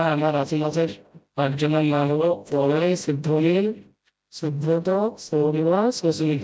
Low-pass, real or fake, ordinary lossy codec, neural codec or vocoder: none; fake; none; codec, 16 kHz, 0.5 kbps, FreqCodec, smaller model